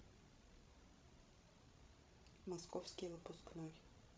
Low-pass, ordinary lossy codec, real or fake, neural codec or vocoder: none; none; fake; codec, 16 kHz, 16 kbps, FreqCodec, larger model